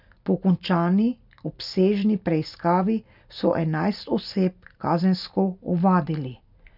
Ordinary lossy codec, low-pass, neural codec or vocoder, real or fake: none; 5.4 kHz; none; real